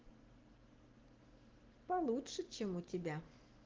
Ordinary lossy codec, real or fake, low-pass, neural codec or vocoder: Opus, 16 kbps; real; 7.2 kHz; none